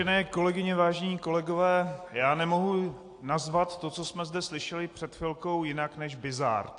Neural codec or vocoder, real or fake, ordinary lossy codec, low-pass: none; real; AAC, 48 kbps; 9.9 kHz